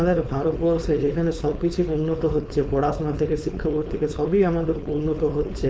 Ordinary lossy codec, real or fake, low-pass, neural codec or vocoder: none; fake; none; codec, 16 kHz, 4.8 kbps, FACodec